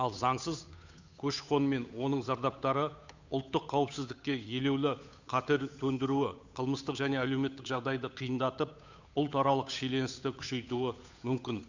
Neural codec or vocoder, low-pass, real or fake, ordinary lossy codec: codec, 16 kHz, 8 kbps, FunCodec, trained on Chinese and English, 25 frames a second; 7.2 kHz; fake; Opus, 64 kbps